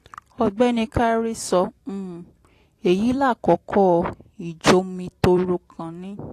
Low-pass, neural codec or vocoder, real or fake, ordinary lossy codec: 14.4 kHz; vocoder, 44.1 kHz, 128 mel bands every 256 samples, BigVGAN v2; fake; AAC, 48 kbps